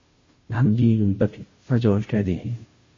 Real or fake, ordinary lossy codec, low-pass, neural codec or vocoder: fake; MP3, 32 kbps; 7.2 kHz; codec, 16 kHz, 0.5 kbps, FunCodec, trained on Chinese and English, 25 frames a second